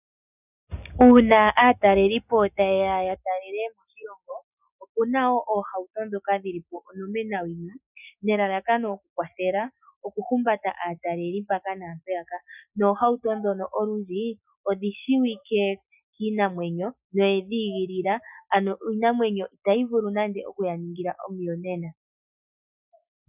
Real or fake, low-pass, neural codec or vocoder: real; 3.6 kHz; none